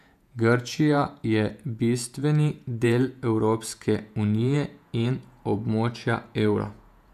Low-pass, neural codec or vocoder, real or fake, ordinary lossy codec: 14.4 kHz; vocoder, 48 kHz, 128 mel bands, Vocos; fake; none